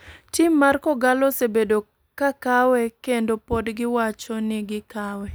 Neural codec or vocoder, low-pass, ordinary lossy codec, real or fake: none; none; none; real